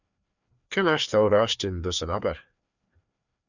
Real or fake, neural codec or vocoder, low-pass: fake; codec, 16 kHz, 2 kbps, FreqCodec, larger model; 7.2 kHz